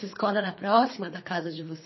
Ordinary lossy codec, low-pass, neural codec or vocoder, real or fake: MP3, 24 kbps; 7.2 kHz; codec, 24 kHz, 3 kbps, HILCodec; fake